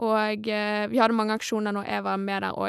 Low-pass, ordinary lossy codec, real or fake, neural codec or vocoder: 14.4 kHz; none; real; none